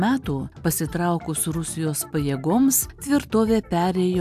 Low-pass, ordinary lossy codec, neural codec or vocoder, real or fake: 14.4 kHz; AAC, 96 kbps; none; real